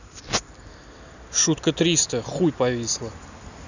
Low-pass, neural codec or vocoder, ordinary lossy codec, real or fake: 7.2 kHz; none; none; real